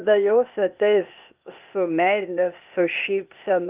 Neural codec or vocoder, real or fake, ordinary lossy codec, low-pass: codec, 16 kHz, 0.8 kbps, ZipCodec; fake; Opus, 32 kbps; 3.6 kHz